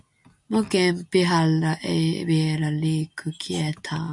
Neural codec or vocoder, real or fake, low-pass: none; real; 10.8 kHz